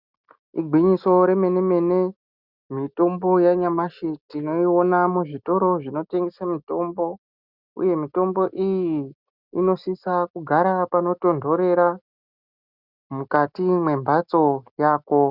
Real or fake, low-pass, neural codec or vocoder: real; 5.4 kHz; none